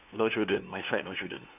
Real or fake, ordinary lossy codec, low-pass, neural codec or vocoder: fake; none; 3.6 kHz; codec, 16 kHz, 2 kbps, FunCodec, trained on LibriTTS, 25 frames a second